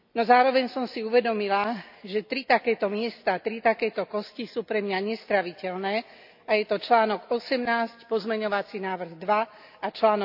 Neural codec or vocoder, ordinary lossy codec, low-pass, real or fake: none; AAC, 48 kbps; 5.4 kHz; real